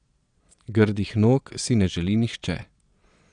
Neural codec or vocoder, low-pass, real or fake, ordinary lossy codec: vocoder, 22.05 kHz, 80 mel bands, Vocos; 9.9 kHz; fake; none